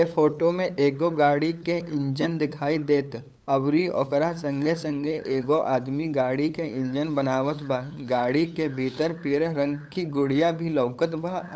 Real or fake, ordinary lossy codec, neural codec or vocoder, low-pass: fake; none; codec, 16 kHz, 8 kbps, FunCodec, trained on LibriTTS, 25 frames a second; none